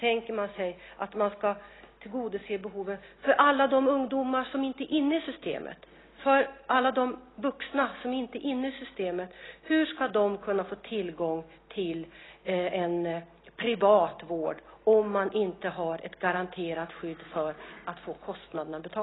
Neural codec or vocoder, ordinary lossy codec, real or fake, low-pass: none; AAC, 16 kbps; real; 7.2 kHz